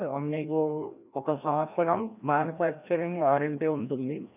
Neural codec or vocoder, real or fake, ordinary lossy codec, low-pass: codec, 16 kHz, 1 kbps, FreqCodec, larger model; fake; none; 3.6 kHz